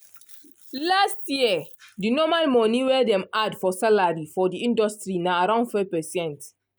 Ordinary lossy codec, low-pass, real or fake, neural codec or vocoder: none; none; real; none